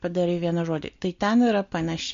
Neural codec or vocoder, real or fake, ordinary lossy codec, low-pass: none; real; MP3, 48 kbps; 7.2 kHz